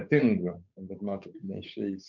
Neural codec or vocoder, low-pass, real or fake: codec, 16 kHz, 8 kbps, FunCodec, trained on Chinese and English, 25 frames a second; 7.2 kHz; fake